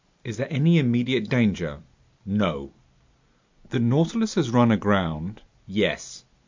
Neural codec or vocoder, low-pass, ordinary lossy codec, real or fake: none; 7.2 kHz; MP3, 64 kbps; real